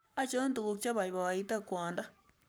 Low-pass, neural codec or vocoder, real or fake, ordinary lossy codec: none; codec, 44.1 kHz, 7.8 kbps, Pupu-Codec; fake; none